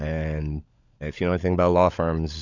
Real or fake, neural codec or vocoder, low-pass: fake; codec, 16 kHz, 16 kbps, FunCodec, trained on LibriTTS, 50 frames a second; 7.2 kHz